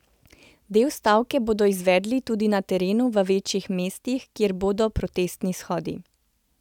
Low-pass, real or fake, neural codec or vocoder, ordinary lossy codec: 19.8 kHz; real; none; none